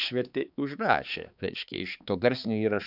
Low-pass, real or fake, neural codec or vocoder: 5.4 kHz; fake; codec, 16 kHz, 4 kbps, X-Codec, HuBERT features, trained on balanced general audio